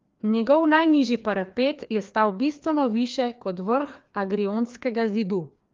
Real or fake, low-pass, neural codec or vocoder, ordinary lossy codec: fake; 7.2 kHz; codec, 16 kHz, 2 kbps, FreqCodec, larger model; Opus, 32 kbps